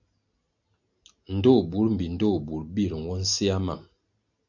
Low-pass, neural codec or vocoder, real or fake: 7.2 kHz; none; real